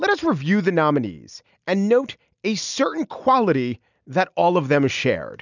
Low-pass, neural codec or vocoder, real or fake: 7.2 kHz; none; real